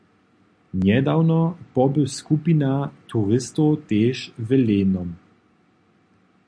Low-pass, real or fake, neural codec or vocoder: 9.9 kHz; real; none